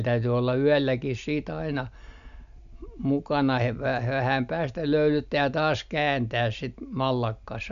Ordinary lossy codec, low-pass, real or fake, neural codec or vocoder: none; 7.2 kHz; real; none